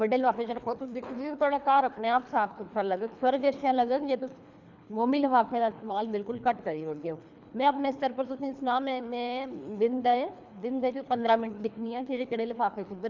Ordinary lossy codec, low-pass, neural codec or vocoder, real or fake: none; 7.2 kHz; codec, 24 kHz, 3 kbps, HILCodec; fake